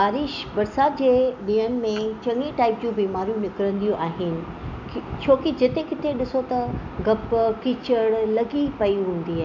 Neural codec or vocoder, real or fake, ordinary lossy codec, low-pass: none; real; none; 7.2 kHz